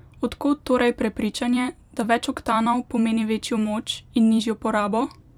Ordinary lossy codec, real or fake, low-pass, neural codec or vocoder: none; fake; 19.8 kHz; vocoder, 44.1 kHz, 128 mel bands every 256 samples, BigVGAN v2